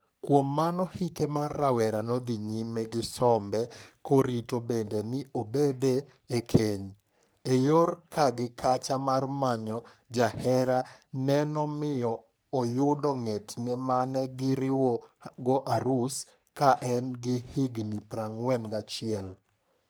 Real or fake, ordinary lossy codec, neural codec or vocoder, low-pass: fake; none; codec, 44.1 kHz, 3.4 kbps, Pupu-Codec; none